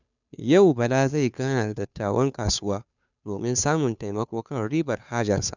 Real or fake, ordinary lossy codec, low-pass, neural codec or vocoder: fake; none; 7.2 kHz; codec, 16 kHz, 2 kbps, FunCodec, trained on Chinese and English, 25 frames a second